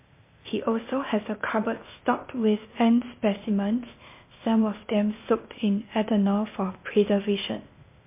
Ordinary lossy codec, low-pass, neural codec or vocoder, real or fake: MP3, 24 kbps; 3.6 kHz; codec, 16 kHz, 0.8 kbps, ZipCodec; fake